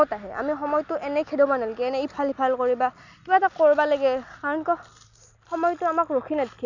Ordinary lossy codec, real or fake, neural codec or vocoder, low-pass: none; real; none; 7.2 kHz